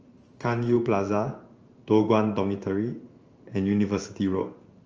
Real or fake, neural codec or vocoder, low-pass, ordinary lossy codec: fake; codec, 16 kHz in and 24 kHz out, 1 kbps, XY-Tokenizer; 7.2 kHz; Opus, 24 kbps